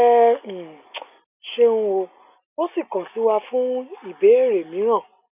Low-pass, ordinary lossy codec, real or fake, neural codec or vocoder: 3.6 kHz; none; real; none